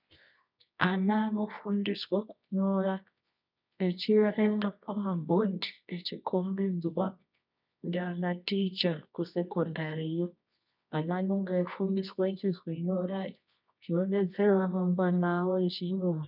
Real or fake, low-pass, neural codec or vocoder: fake; 5.4 kHz; codec, 24 kHz, 0.9 kbps, WavTokenizer, medium music audio release